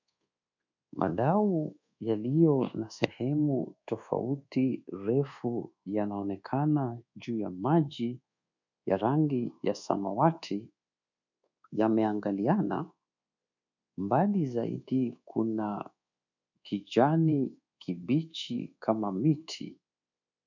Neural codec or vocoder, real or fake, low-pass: codec, 24 kHz, 1.2 kbps, DualCodec; fake; 7.2 kHz